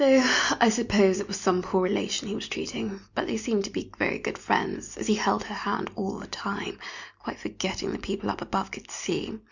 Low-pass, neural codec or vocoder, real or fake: 7.2 kHz; none; real